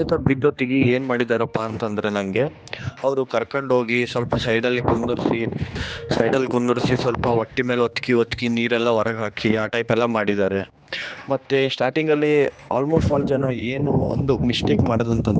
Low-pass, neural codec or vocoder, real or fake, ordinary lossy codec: none; codec, 16 kHz, 2 kbps, X-Codec, HuBERT features, trained on general audio; fake; none